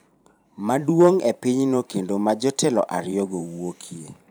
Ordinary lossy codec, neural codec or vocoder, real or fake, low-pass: none; vocoder, 44.1 kHz, 128 mel bands every 512 samples, BigVGAN v2; fake; none